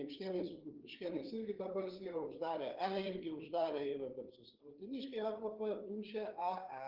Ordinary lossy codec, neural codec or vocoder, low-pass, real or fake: Opus, 16 kbps; codec, 16 kHz, 4 kbps, FreqCodec, larger model; 5.4 kHz; fake